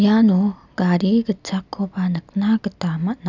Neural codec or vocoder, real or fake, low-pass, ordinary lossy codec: none; real; 7.2 kHz; none